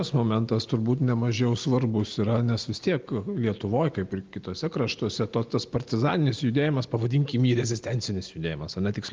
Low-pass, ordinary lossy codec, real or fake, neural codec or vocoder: 7.2 kHz; Opus, 32 kbps; real; none